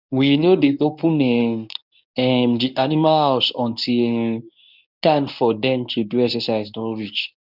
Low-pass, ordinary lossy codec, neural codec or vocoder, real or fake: 5.4 kHz; none; codec, 24 kHz, 0.9 kbps, WavTokenizer, medium speech release version 1; fake